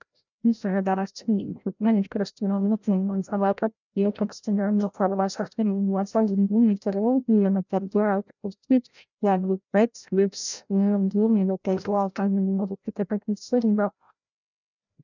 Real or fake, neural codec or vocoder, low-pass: fake; codec, 16 kHz, 0.5 kbps, FreqCodec, larger model; 7.2 kHz